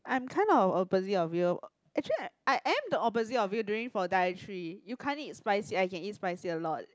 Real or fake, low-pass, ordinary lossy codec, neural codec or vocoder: real; none; none; none